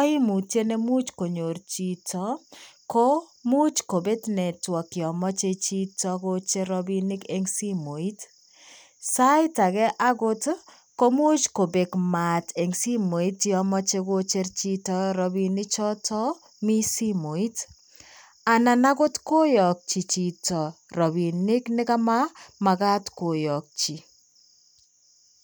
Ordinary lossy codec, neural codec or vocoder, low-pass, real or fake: none; none; none; real